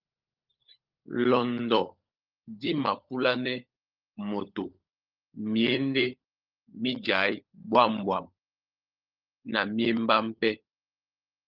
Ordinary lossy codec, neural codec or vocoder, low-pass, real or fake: Opus, 24 kbps; codec, 16 kHz, 16 kbps, FunCodec, trained on LibriTTS, 50 frames a second; 5.4 kHz; fake